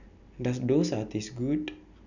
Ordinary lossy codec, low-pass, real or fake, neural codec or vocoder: none; 7.2 kHz; real; none